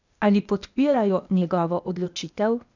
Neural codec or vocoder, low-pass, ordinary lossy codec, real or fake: codec, 16 kHz, 0.8 kbps, ZipCodec; 7.2 kHz; none; fake